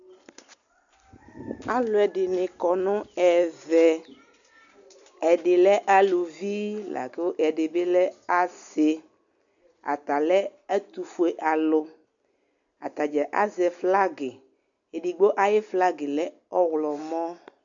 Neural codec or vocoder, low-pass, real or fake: none; 7.2 kHz; real